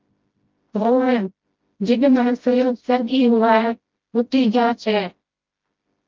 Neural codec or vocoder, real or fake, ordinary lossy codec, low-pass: codec, 16 kHz, 0.5 kbps, FreqCodec, smaller model; fake; Opus, 32 kbps; 7.2 kHz